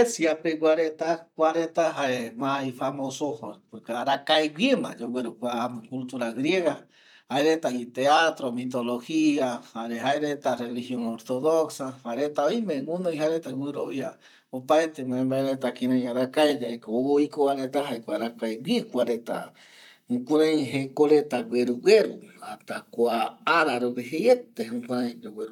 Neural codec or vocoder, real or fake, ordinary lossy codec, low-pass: vocoder, 44.1 kHz, 128 mel bands, Pupu-Vocoder; fake; none; 19.8 kHz